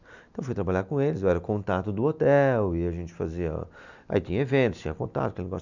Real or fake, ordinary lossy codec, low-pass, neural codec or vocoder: real; none; 7.2 kHz; none